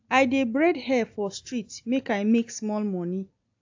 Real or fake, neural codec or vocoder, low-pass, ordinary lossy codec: real; none; 7.2 kHz; AAC, 48 kbps